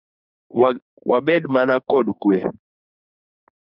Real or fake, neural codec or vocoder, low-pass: fake; codec, 44.1 kHz, 2.6 kbps, SNAC; 5.4 kHz